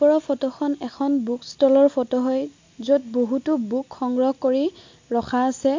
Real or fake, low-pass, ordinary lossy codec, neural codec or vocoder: real; 7.2 kHz; MP3, 48 kbps; none